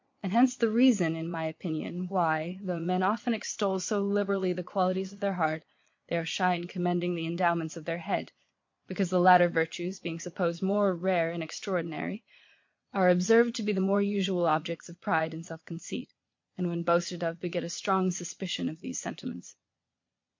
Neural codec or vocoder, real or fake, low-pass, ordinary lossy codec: vocoder, 22.05 kHz, 80 mel bands, Vocos; fake; 7.2 kHz; MP3, 48 kbps